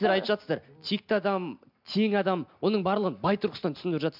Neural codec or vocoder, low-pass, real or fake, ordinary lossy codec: none; 5.4 kHz; real; MP3, 48 kbps